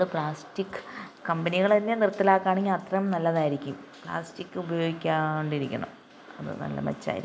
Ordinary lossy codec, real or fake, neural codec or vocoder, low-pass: none; real; none; none